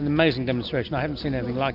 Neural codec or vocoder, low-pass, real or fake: none; 5.4 kHz; real